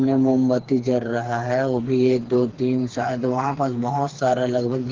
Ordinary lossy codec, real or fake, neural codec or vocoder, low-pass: Opus, 16 kbps; fake; codec, 16 kHz, 4 kbps, FreqCodec, smaller model; 7.2 kHz